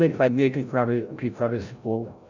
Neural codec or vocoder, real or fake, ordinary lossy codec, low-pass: codec, 16 kHz, 0.5 kbps, FreqCodec, larger model; fake; none; 7.2 kHz